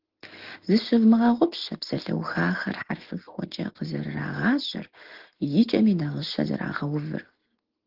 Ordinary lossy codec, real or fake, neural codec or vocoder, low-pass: Opus, 16 kbps; real; none; 5.4 kHz